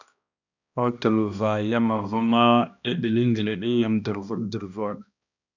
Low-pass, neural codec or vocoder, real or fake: 7.2 kHz; codec, 16 kHz, 1 kbps, X-Codec, HuBERT features, trained on balanced general audio; fake